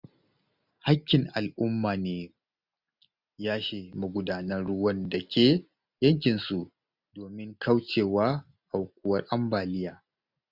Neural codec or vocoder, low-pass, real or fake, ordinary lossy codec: none; 5.4 kHz; real; none